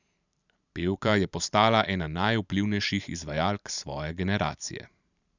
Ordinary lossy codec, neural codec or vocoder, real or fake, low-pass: none; vocoder, 44.1 kHz, 128 mel bands every 512 samples, BigVGAN v2; fake; 7.2 kHz